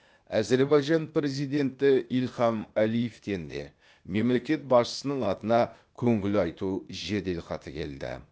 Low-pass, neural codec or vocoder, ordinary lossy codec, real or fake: none; codec, 16 kHz, 0.8 kbps, ZipCodec; none; fake